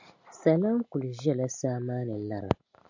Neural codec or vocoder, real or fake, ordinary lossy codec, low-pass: none; real; MP3, 64 kbps; 7.2 kHz